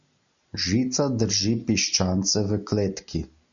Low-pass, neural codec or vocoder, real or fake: 7.2 kHz; none; real